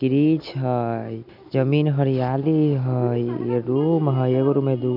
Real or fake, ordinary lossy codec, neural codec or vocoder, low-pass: real; AAC, 48 kbps; none; 5.4 kHz